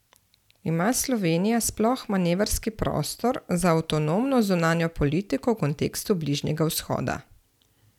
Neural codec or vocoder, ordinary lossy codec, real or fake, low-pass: vocoder, 44.1 kHz, 128 mel bands every 256 samples, BigVGAN v2; none; fake; 19.8 kHz